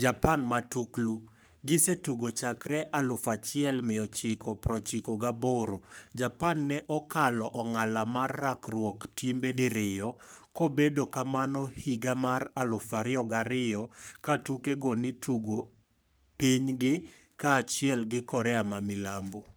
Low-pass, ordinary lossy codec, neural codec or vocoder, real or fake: none; none; codec, 44.1 kHz, 3.4 kbps, Pupu-Codec; fake